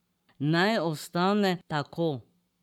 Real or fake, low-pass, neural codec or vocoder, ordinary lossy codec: fake; 19.8 kHz; codec, 44.1 kHz, 7.8 kbps, Pupu-Codec; none